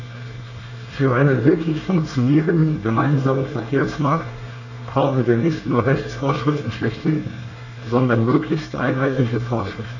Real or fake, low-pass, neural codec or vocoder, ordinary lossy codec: fake; 7.2 kHz; codec, 24 kHz, 1 kbps, SNAC; none